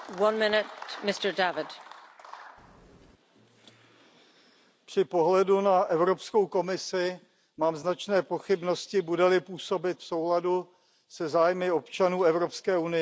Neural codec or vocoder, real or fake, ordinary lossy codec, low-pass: none; real; none; none